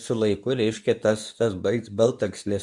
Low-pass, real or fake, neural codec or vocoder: 10.8 kHz; fake; codec, 24 kHz, 0.9 kbps, WavTokenizer, medium speech release version 2